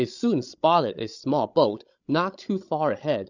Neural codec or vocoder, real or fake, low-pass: codec, 16 kHz, 16 kbps, FreqCodec, larger model; fake; 7.2 kHz